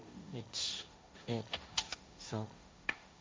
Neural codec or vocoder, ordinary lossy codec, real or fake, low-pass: codec, 16 kHz, 1.1 kbps, Voila-Tokenizer; none; fake; none